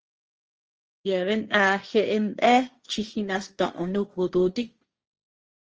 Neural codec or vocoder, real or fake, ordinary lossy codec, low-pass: codec, 16 kHz, 1.1 kbps, Voila-Tokenizer; fake; Opus, 24 kbps; 7.2 kHz